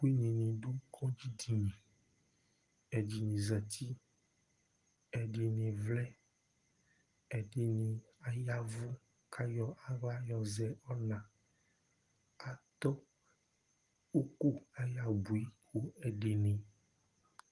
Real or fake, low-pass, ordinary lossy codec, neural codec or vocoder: real; 10.8 kHz; Opus, 24 kbps; none